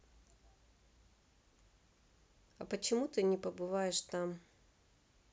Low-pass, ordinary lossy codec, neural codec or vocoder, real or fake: none; none; none; real